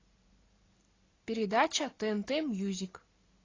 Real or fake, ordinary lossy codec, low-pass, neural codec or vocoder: real; AAC, 32 kbps; 7.2 kHz; none